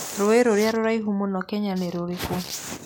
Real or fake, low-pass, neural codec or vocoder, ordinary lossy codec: real; none; none; none